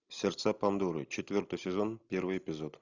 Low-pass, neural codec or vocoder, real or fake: 7.2 kHz; none; real